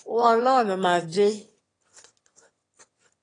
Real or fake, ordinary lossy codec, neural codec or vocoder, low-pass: fake; AAC, 32 kbps; autoencoder, 22.05 kHz, a latent of 192 numbers a frame, VITS, trained on one speaker; 9.9 kHz